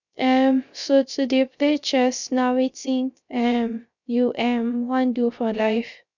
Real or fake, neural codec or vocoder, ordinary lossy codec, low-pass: fake; codec, 16 kHz, 0.3 kbps, FocalCodec; none; 7.2 kHz